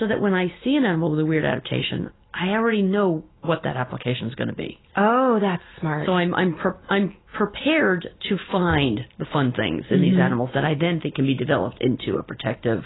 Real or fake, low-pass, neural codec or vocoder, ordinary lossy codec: real; 7.2 kHz; none; AAC, 16 kbps